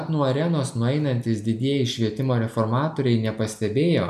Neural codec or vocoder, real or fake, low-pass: none; real; 14.4 kHz